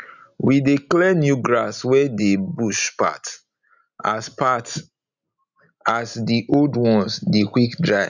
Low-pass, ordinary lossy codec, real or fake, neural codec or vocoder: 7.2 kHz; none; real; none